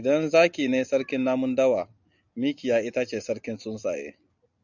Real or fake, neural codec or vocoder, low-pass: real; none; 7.2 kHz